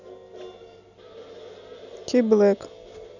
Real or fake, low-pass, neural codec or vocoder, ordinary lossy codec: real; 7.2 kHz; none; none